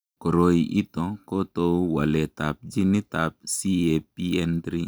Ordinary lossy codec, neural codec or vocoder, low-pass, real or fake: none; none; none; real